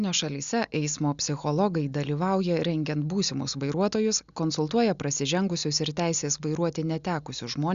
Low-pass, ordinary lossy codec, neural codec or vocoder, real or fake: 7.2 kHz; Opus, 64 kbps; none; real